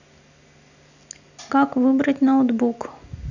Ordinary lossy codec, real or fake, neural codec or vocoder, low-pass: none; real; none; 7.2 kHz